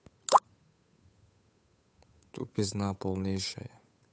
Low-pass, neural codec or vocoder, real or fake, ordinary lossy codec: none; codec, 16 kHz, 8 kbps, FunCodec, trained on Chinese and English, 25 frames a second; fake; none